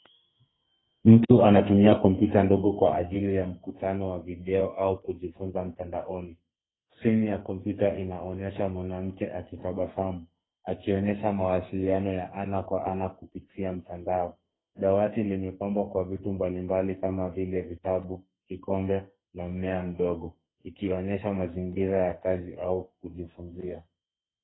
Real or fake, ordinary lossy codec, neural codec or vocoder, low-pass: fake; AAC, 16 kbps; codec, 44.1 kHz, 2.6 kbps, SNAC; 7.2 kHz